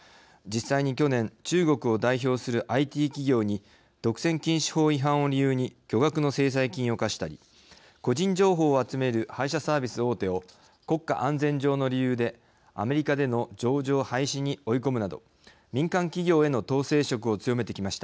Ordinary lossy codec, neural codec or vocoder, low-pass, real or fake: none; none; none; real